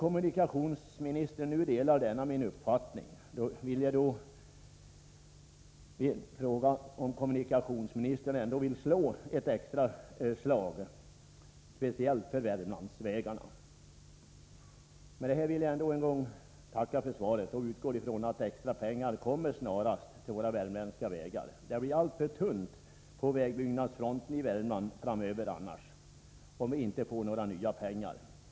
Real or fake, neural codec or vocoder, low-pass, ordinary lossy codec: real; none; none; none